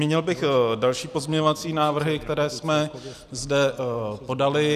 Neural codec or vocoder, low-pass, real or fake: none; 14.4 kHz; real